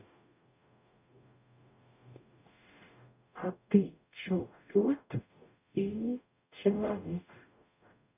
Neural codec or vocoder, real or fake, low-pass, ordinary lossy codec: codec, 44.1 kHz, 0.9 kbps, DAC; fake; 3.6 kHz; none